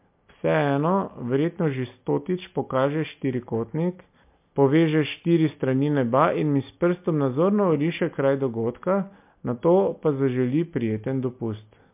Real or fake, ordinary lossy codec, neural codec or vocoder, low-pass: real; MP3, 32 kbps; none; 3.6 kHz